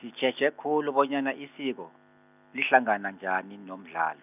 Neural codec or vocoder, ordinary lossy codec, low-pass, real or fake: none; none; 3.6 kHz; real